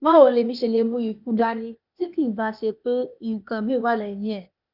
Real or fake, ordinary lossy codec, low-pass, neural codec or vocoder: fake; none; 5.4 kHz; codec, 16 kHz, 0.8 kbps, ZipCodec